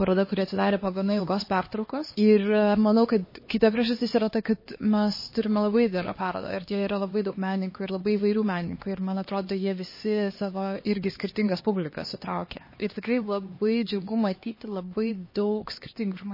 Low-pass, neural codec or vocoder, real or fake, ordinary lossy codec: 5.4 kHz; codec, 16 kHz, 2 kbps, X-Codec, HuBERT features, trained on LibriSpeech; fake; MP3, 24 kbps